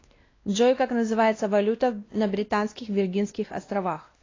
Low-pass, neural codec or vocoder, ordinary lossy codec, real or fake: 7.2 kHz; codec, 16 kHz, 1 kbps, X-Codec, WavLM features, trained on Multilingual LibriSpeech; AAC, 32 kbps; fake